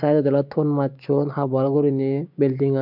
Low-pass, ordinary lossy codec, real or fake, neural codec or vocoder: 5.4 kHz; MP3, 48 kbps; fake; codec, 16 kHz, 6 kbps, DAC